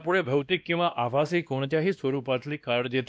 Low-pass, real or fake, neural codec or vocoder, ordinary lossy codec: none; fake; codec, 16 kHz, 1 kbps, X-Codec, WavLM features, trained on Multilingual LibriSpeech; none